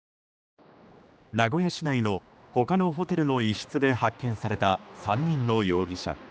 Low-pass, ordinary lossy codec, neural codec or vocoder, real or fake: none; none; codec, 16 kHz, 2 kbps, X-Codec, HuBERT features, trained on general audio; fake